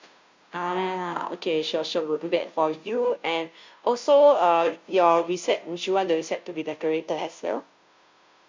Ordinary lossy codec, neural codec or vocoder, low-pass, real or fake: MP3, 48 kbps; codec, 16 kHz, 0.5 kbps, FunCodec, trained on Chinese and English, 25 frames a second; 7.2 kHz; fake